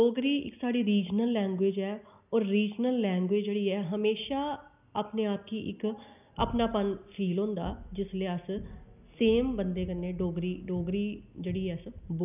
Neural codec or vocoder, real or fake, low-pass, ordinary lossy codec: none; real; 3.6 kHz; none